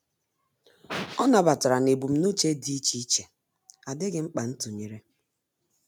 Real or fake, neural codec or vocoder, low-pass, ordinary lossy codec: real; none; none; none